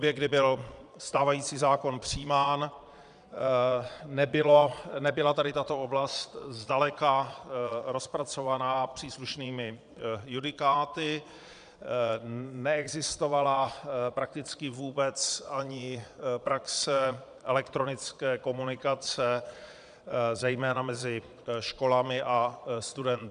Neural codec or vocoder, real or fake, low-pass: vocoder, 22.05 kHz, 80 mel bands, Vocos; fake; 9.9 kHz